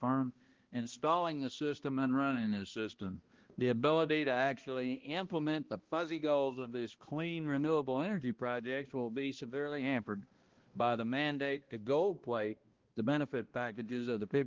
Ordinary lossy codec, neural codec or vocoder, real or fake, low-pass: Opus, 16 kbps; codec, 16 kHz, 1 kbps, X-Codec, HuBERT features, trained on balanced general audio; fake; 7.2 kHz